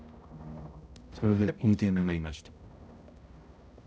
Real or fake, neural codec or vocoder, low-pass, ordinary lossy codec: fake; codec, 16 kHz, 0.5 kbps, X-Codec, HuBERT features, trained on balanced general audio; none; none